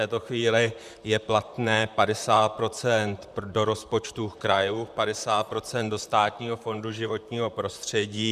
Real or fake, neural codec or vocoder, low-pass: fake; vocoder, 44.1 kHz, 128 mel bands, Pupu-Vocoder; 14.4 kHz